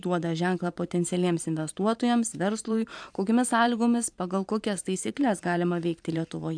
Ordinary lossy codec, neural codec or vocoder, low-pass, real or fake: AAC, 64 kbps; vocoder, 44.1 kHz, 128 mel bands every 512 samples, BigVGAN v2; 9.9 kHz; fake